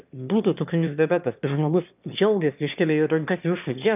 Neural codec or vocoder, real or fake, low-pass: autoencoder, 22.05 kHz, a latent of 192 numbers a frame, VITS, trained on one speaker; fake; 3.6 kHz